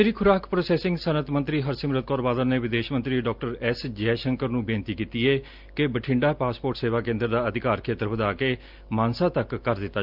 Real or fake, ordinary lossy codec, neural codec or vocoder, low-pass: real; Opus, 24 kbps; none; 5.4 kHz